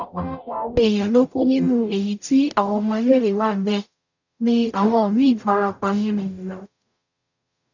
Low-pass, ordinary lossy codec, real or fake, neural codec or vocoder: 7.2 kHz; none; fake; codec, 44.1 kHz, 0.9 kbps, DAC